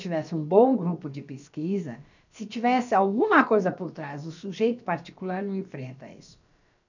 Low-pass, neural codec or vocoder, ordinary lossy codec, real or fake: 7.2 kHz; codec, 16 kHz, about 1 kbps, DyCAST, with the encoder's durations; none; fake